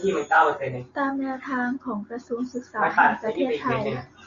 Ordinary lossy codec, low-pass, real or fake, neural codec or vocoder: Opus, 64 kbps; 7.2 kHz; real; none